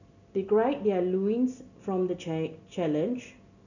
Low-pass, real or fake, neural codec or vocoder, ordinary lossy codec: 7.2 kHz; real; none; none